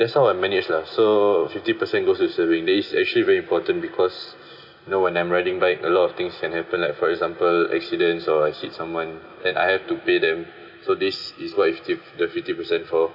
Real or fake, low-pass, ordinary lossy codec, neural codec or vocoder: real; 5.4 kHz; none; none